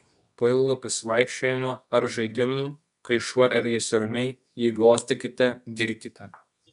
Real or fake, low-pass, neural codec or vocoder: fake; 10.8 kHz; codec, 24 kHz, 0.9 kbps, WavTokenizer, medium music audio release